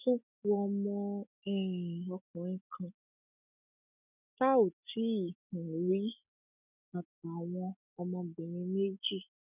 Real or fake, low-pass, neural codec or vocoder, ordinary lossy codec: real; 3.6 kHz; none; none